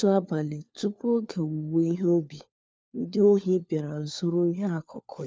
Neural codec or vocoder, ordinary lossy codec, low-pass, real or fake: codec, 16 kHz, 4 kbps, FunCodec, trained on LibriTTS, 50 frames a second; none; none; fake